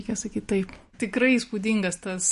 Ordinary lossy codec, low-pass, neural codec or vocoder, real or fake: MP3, 48 kbps; 10.8 kHz; none; real